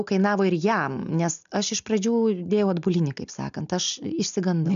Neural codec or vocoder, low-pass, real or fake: none; 7.2 kHz; real